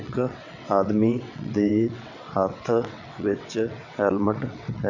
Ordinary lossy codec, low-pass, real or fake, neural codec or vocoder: none; 7.2 kHz; fake; vocoder, 22.05 kHz, 80 mel bands, WaveNeXt